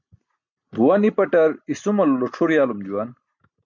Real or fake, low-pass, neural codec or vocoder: real; 7.2 kHz; none